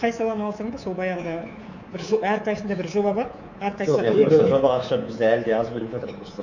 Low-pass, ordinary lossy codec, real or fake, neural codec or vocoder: 7.2 kHz; none; fake; codec, 24 kHz, 3.1 kbps, DualCodec